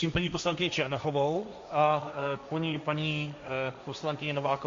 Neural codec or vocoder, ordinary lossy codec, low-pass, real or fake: codec, 16 kHz, 1.1 kbps, Voila-Tokenizer; MP3, 48 kbps; 7.2 kHz; fake